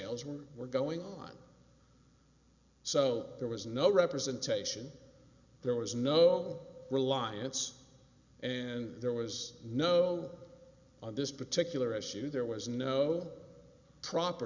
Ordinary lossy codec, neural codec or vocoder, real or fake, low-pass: Opus, 64 kbps; none; real; 7.2 kHz